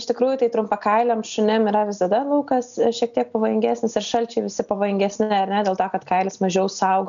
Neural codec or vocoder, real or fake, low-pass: none; real; 7.2 kHz